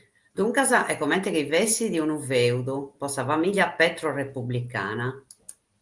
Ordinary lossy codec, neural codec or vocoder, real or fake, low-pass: Opus, 32 kbps; none; real; 10.8 kHz